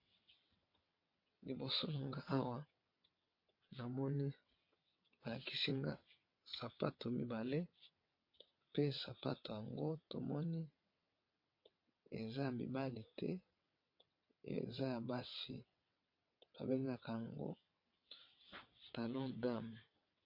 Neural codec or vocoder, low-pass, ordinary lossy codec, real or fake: vocoder, 22.05 kHz, 80 mel bands, WaveNeXt; 5.4 kHz; MP3, 32 kbps; fake